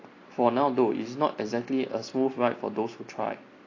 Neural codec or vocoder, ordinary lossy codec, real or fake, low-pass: none; AAC, 32 kbps; real; 7.2 kHz